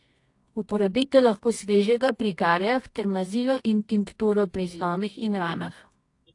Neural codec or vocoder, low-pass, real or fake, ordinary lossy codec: codec, 24 kHz, 0.9 kbps, WavTokenizer, medium music audio release; 10.8 kHz; fake; AAC, 48 kbps